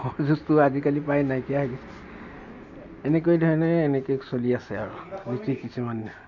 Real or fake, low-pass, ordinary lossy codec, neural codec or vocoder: real; 7.2 kHz; none; none